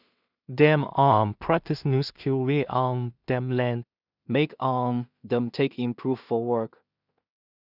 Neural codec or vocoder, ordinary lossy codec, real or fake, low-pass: codec, 16 kHz in and 24 kHz out, 0.4 kbps, LongCat-Audio-Codec, two codebook decoder; none; fake; 5.4 kHz